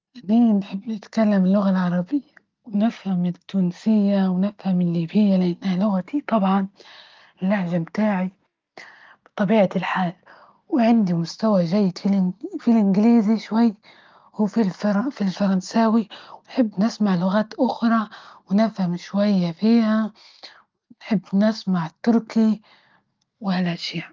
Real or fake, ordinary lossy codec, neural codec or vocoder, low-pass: real; Opus, 24 kbps; none; 7.2 kHz